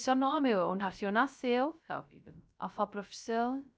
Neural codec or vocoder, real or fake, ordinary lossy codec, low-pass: codec, 16 kHz, 0.3 kbps, FocalCodec; fake; none; none